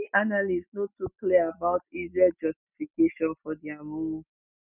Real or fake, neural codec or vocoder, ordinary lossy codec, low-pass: real; none; none; 3.6 kHz